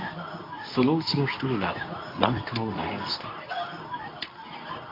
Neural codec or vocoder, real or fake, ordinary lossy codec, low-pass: codec, 24 kHz, 0.9 kbps, WavTokenizer, medium speech release version 2; fake; AAC, 24 kbps; 5.4 kHz